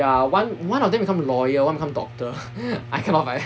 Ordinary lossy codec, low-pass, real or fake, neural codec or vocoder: none; none; real; none